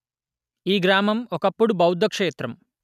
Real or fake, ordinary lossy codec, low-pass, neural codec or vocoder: real; none; 14.4 kHz; none